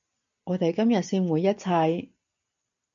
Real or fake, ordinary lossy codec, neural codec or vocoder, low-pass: real; MP3, 64 kbps; none; 7.2 kHz